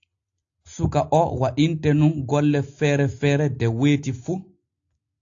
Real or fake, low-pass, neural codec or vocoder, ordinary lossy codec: real; 7.2 kHz; none; AAC, 48 kbps